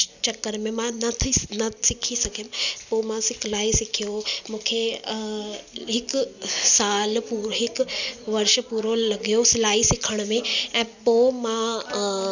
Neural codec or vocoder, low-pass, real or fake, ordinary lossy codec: none; 7.2 kHz; real; none